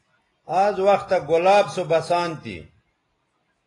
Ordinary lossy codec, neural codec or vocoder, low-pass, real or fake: AAC, 32 kbps; none; 10.8 kHz; real